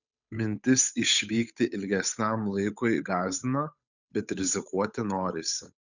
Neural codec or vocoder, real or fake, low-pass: codec, 16 kHz, 8 kbps, FunCodec, trained on Chinese and English, 25 frames a second; fake; 7.2 kHz